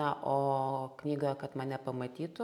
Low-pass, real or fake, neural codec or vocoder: 19.8 kHz; real; none